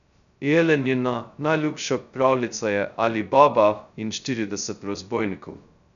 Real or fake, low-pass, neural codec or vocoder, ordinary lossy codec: fake; 7.2 kHz; codec, 16 kHz, 0.2 kbps, FocalCodec; none